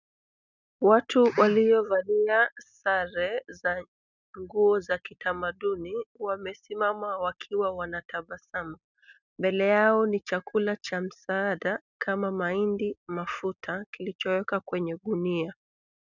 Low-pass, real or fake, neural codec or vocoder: 7.2 kHz; real; none